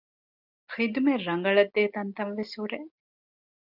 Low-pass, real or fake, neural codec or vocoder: 5.4 kHz; real; none